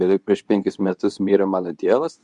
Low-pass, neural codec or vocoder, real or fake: 10.8 kHz; codec, 24 kHz, 0.9 kbps, WavTokenizer, medium speech release version 2; fake